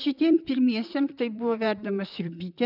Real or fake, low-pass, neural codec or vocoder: fake; 5.4 kHz; vocoder, 44.1 kHz, 128 mel bands, Pupu-Vocoder